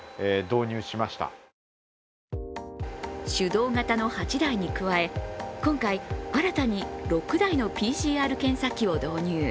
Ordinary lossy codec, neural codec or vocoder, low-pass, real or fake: none; none; none; real